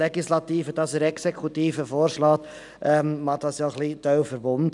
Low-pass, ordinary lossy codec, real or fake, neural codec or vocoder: 10.8 kHz; none; real; none